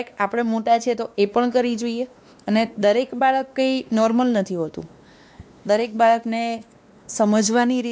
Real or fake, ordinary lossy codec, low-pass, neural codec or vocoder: fake; none; none; codec, 16 kHz, 2 kbps, X-Codec, WavLM features, trained on Multilingual LibriSpeech